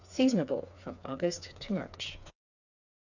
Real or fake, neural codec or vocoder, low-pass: fake; codec, 16 kHz, 4 kbps, FreqCodec, smaller model; 7.2 kHz